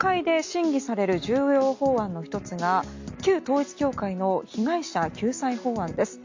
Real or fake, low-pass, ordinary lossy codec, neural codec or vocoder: real; 7.2 kHz; MP3, 48 kbps; none